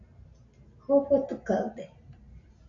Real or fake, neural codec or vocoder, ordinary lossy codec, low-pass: real; none; MP3, 64 kbps; 7.2 kHz